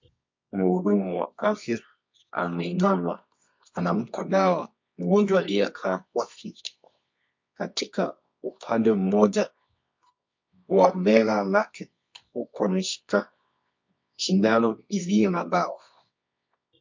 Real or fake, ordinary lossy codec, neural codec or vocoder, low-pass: fake; MP3, 48 kbps; codec, 24 kHz, 0.9 kbps, WavTokenizer, medium music audio release; 7.2 kHz